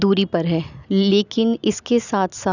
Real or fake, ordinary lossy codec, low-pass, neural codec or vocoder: real; none; 7.2 kHz; none